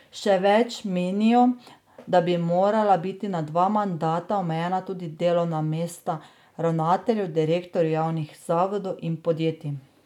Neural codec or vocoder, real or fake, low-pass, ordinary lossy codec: none; real; 19.8 kHz; none